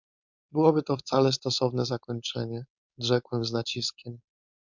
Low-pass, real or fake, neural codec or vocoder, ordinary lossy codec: 7.2 kHz; fake; codec, 16 kHz, 4.8 kbps, FACodec; MP3, 64 kbps